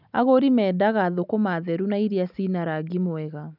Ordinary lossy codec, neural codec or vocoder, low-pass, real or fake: none; none; 5.4 kHz; real